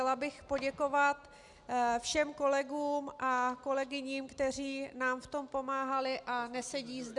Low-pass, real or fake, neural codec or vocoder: 10.8 kHz; real; none